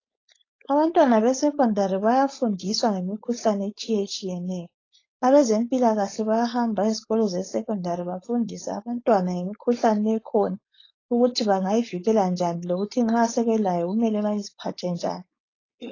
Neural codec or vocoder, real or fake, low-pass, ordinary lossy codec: codec, 16 kHz, 4.8 kbps, FACodec; fake; 7.2 kHz; AAC, 32 kbps